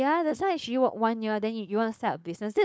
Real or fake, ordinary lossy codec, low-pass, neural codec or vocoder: fake; none; none; codec, 16 kHz, 4.8 kbps, FACodec